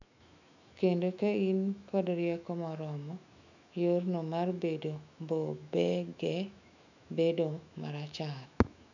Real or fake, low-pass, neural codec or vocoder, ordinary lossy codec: fake; 7.2 kHz; autoencoder, 48 kHz, 128 numbers a frame, DAC-VAE, trained on Japanese speech; none